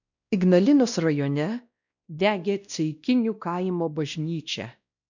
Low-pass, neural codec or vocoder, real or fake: 7.2 kHz; codec, 16 kHz, 1 kbps, X-Codec, WavLM features, trained on Multilingual LibriSpeech; fake